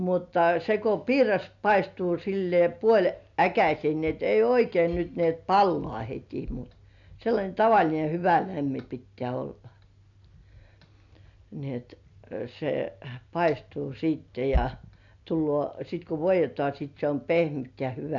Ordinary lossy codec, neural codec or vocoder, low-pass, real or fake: none; none; 7.2 kHz; real